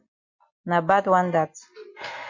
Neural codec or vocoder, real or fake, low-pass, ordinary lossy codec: none; real; 7.2 kHz; MP3, 48 kbps